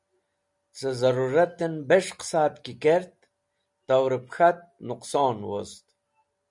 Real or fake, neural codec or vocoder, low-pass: real; none; 10.8 kHz